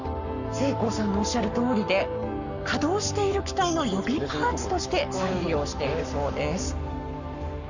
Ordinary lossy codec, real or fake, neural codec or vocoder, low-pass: none; fake; codec, 44.1 kHz, 7.8 kbps, Pupu-Codec; 7.2 kHz